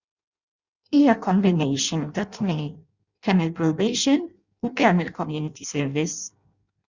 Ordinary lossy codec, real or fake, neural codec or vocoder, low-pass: Opus, 64 kbps; fake; codec, 16 kHz in and 24 kHz out, 0.6 kbps, FireRedTTS-2 codec; 7.2 kHz